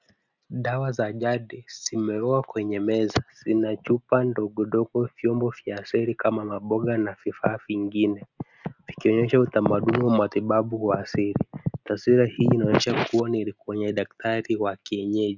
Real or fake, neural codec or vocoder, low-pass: real; none; 7.2 kHz